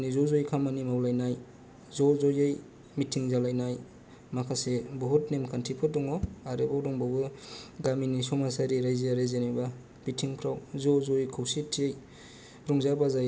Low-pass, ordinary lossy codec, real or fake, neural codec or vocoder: none; none; real; none